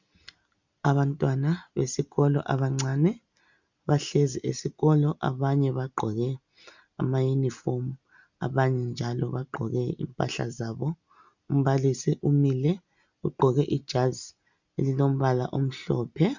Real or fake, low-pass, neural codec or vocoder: real; 7.2 kHz; none